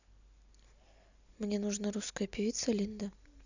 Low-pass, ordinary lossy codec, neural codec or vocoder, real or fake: 7.2 kHz; none; none; real